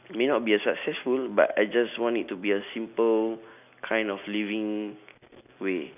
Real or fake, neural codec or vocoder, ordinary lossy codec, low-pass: real; none; none; 3.6 kHz